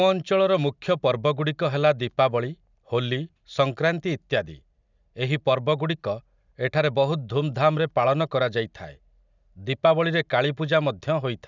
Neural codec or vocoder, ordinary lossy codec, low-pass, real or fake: none; none; 7.2 kHz; real